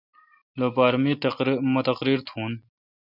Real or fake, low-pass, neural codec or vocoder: real; 5.4 kHz; none